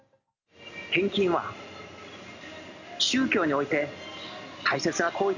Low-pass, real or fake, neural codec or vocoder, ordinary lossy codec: 7.2 kHz; real; none; none